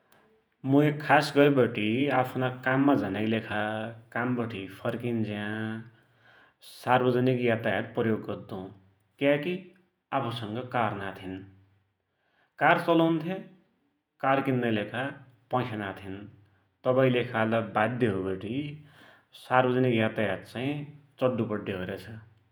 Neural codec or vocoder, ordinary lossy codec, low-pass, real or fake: vocoder, 48 kHz, 128 mel bands, Vocos; none; none; fake